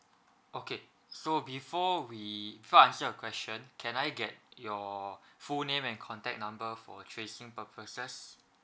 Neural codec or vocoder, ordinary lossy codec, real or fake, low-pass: none; none; real; none